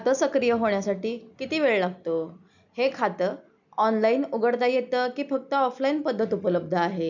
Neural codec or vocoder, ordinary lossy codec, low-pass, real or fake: none; none; 7.2 kHz; real